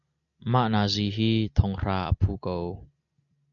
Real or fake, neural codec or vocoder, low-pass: real; none; 7.2 kHz